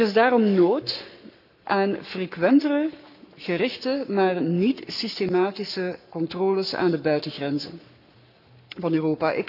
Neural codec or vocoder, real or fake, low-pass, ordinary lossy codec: codec, 44.1 kHz, 7.8 kbps, Pupu-Codec; fake; 5.4 kHz; none